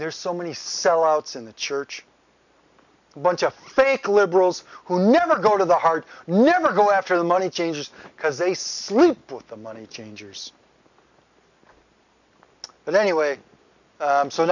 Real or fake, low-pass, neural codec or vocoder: real; 7.2 kHz; none